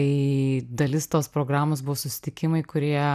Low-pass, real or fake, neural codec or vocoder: 14.4 kHz; real; none